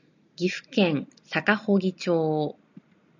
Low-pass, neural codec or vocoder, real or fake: 7.2 kHz; none; real